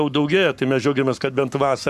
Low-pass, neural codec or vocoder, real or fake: 14.4 kHz; codec, 44.1 kHz, 7.8 kbps, Pupu-Codec; fake